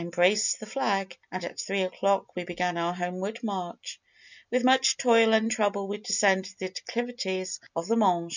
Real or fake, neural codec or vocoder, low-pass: real; none; 7.2 kHz